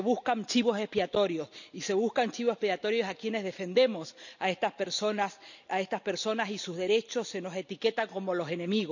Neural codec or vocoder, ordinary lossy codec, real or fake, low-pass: none; none; real; 7.2 kHz